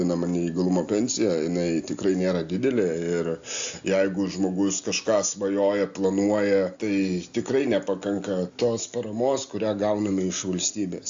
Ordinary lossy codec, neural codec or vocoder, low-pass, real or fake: AAC, 64 kbps; none; 7.2 kHz; real